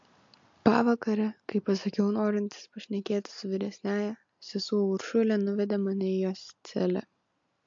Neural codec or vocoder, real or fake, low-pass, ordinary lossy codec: none; real; 7.2 kHz; MP3, 48 kbps